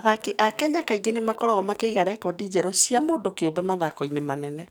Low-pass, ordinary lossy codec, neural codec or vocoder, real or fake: none; none; codec, 44.1 kHz, 2.6 kbps, SNAC; fake